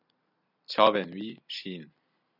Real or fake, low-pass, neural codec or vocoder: real; 5.4 kHz; none